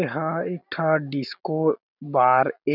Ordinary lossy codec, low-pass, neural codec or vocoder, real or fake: none; 5.4 kHz; none; real